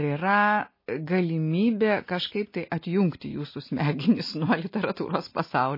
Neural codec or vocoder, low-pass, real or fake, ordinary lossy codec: none; 5.4 kHz; real; MP3, 24 kbps